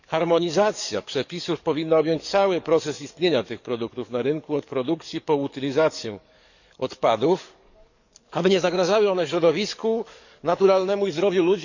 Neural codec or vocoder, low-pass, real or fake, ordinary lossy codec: codec, 44.1 kHz, 7.8 kbps, DAC; 7.2 kHz; fake; none